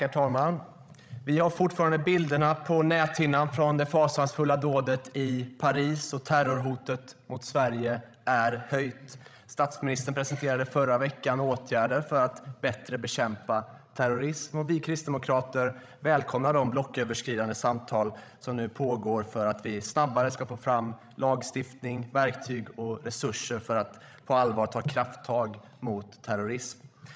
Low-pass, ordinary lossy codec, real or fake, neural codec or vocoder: none; none; fake; codec, 16 kHz, 16 kbps, FreqCodec, larger model